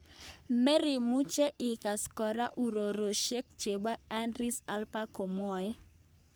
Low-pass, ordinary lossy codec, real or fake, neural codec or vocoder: none; none; fake; codec, 44.1 kHz, 3.4 kbps, Pupu-Codec